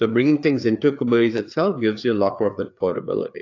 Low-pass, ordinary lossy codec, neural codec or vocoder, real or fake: 7.2 kHz; AAC, 48 kbps; codec, 16 kHz, 4 kbps, FreqCodec, larger model; fake